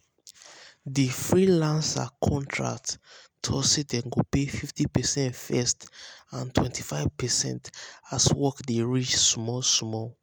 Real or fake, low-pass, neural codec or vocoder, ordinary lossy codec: real; none; none; none